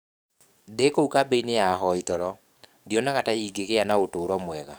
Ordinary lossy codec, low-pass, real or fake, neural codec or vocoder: none; none; fake; codec, 44.1 kHz, 7.8 kbps, DAC